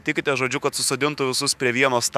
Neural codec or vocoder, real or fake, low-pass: autoencoder, 48 kHz, 128 numbers a frame, DAC-VAE, trained on Japanese speech; fake; 14.4 kHz